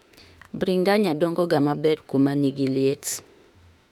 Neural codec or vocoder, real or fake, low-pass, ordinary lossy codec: autoencoder, 48 kHz, 32 numbers a frame, DAC-VAE, trained on Japanese speech; fake; 19.8 kHz; none